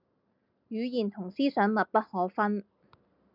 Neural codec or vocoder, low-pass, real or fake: none; 5.4 kHz; real